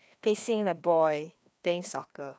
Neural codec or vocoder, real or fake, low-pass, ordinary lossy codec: codec, 16 kHz, 2 kbps, FreqCodec, larger model; fake; none; none